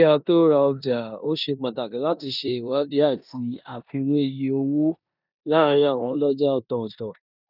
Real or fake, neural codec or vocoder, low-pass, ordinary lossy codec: fake; codec, 16 kHz in and 24 kHz out, 0.9 kbps, LongCat-Audio-Codec, four codebook decoder; 5.4 kHz; none